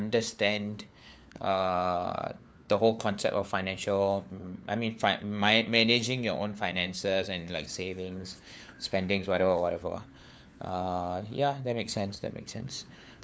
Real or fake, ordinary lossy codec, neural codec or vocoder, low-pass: fake; none; codec, 16 kHz, 4 kbps, FunCodec, trained on LibriTTS, 50 frames a second; none